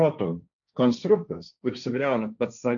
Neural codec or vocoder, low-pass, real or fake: codec, 16 kHz, 1.1 kbps, Voila-Tokenizer; 7.2 kHz; fake